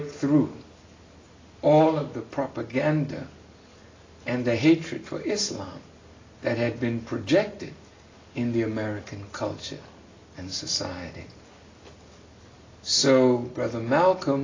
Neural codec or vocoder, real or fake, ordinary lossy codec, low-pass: none; real; AAC, 32 kbps; 7.2 kHz